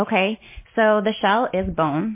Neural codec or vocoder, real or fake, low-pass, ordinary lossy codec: none; real; 3.6 kHz; MP3, 24 kbps